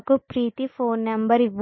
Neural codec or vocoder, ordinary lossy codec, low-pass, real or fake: none; MP3, 24 kbps; 7.2 kHz; real